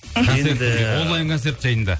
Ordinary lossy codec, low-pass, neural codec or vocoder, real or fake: none; none; none; real